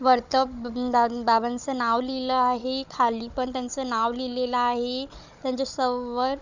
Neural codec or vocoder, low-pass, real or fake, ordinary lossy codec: codec, 16 kHz, 16 kbps, FunCodec, trained on Chinese and English, 50 frames a second; 7.2 kHz; fake; none